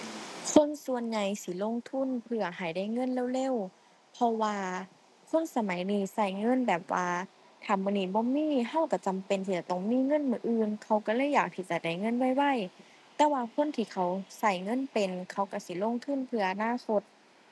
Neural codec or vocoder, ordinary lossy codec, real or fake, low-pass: none; none; real; none